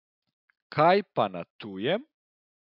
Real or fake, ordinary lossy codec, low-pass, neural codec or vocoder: real; none; 5.4 kHz; none